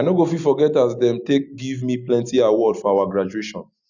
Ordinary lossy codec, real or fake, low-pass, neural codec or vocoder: none; real; 7.2 kHz; none